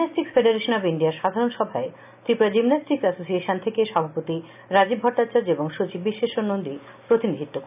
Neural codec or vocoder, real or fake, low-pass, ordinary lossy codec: none; real; 3.6 kHz; none